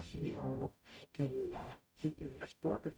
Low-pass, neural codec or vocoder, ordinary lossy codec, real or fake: none; codec, 44.1 kHz, 0.9 kbps, DAC; none; fake